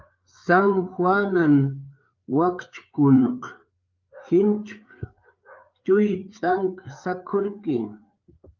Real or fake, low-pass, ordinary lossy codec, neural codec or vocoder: fake; 7.2 kHz; Opus, 24 kbps; codec, 16 kHz, 4 kbps, FreqCodec, larger model